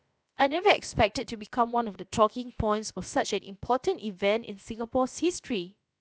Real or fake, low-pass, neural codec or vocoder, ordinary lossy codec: fake; none; codec, 16 kHz, about 1 kbps, DyCAST, with the encoder's durations; none